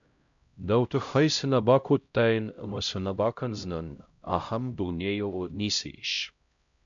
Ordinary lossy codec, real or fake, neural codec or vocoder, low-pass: MP3, 64 kbps; fake; codec, 16 kHz, 0.5 kbps, X-Codec, HuBERT features, trained on LibriSpeech; 7.2 kHz